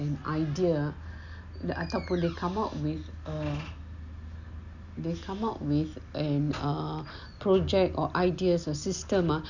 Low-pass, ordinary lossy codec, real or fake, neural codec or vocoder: 7.2 kHz; none; real; none